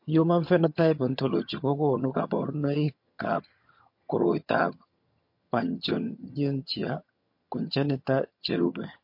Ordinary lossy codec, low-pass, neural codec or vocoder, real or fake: MP3, 32 kbps; 5.4 kHz; vocoder, 22.05 kHz, 80 mel bands, HiFi-GAN; fake